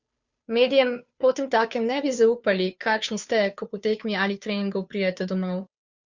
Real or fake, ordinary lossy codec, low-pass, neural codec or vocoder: fake; Opus, 64 kbps; 7.2 kHz; codec, 16 kHz, 2 kbps, FunCodec, trained on Chinese and English, 25 frames a second